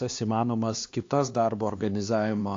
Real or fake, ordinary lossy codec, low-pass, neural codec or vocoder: fake; AAC, 48 kbps; 7.2 kHz; codec, 16 kHz, 2 kbps, X-Codec, HuBERT features, trained on LibriSpeech